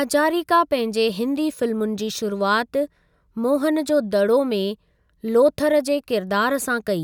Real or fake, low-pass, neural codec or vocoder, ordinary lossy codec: real; 19.8 kHz; none; none